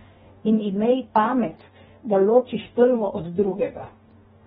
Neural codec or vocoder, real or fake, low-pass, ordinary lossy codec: codec, 44.1 kHz, 2.6 kbps, DAC; fake; 19.8 kHz; AAC, 16 kbps